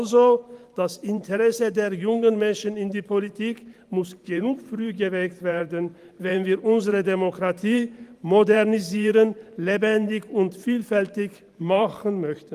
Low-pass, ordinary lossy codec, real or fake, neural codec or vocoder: 14.4 kHz; Opus, 32 kbps; fake; vocoder, 44.1 kHz, 128 mel bands every 512 samples, BigVGAN v2